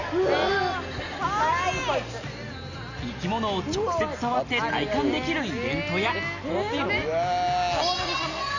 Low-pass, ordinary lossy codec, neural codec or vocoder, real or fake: 7.2 kHz; none; none; real